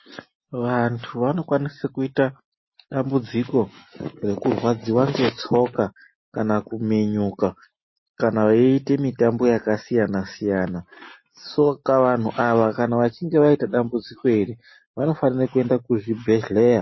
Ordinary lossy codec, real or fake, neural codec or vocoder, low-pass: MP3, 24 kbps; real; none; 7.2 kHz